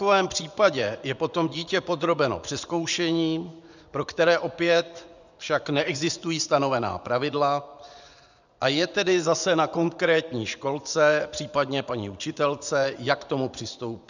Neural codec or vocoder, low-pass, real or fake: vocoder, 24 kHz, 100 mel bands, Vocos; 7.2 kHz; fake